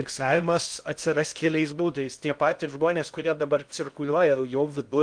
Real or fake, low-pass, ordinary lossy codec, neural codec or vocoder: fake; 9.9 kHz; Opus, 32 kbps; codec, 16 kHz in and 24 kHz out, 0.6 kbps, FocalCodec, streaming, 2048 codes